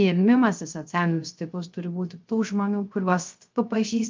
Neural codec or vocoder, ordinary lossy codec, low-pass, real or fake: codec, 16 kHz, 0.3 kbps, FocalCodec; Opus, 32 kbps; 7.2 kHz; fake